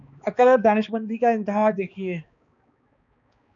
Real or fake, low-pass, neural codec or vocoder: fake; 7.2 kHz; codec, 16 kHz, 2 kbps, X-Codec, HuBERT features, trained on balanced general audio